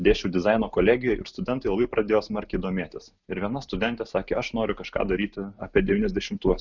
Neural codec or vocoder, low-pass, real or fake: none; 7.2 kHz; real